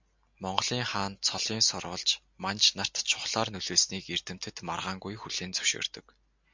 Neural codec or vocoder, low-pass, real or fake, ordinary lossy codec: none; 7.2 kHz; real; MP3, 64 kbps